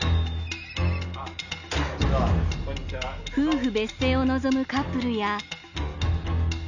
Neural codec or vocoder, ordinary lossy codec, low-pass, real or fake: none; none; 7.2 kHz; real